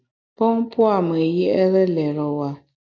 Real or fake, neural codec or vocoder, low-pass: real; none; 7.2 kHz